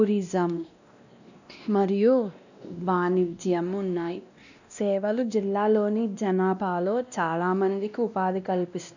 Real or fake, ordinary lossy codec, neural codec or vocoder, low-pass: fake; none; codec, 16 kHz, 1 kbps, X-Codec, WavLM features, trained on Multilingual LibriSpeech; 7.2 kHz